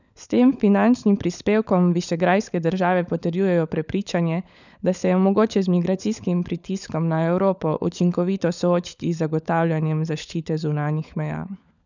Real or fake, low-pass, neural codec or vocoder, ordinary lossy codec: fake; 7.2 kHz; codec, 16 kHz, 16 kbps, FunCodec, trained on LibriTTS, 50 frames a second; none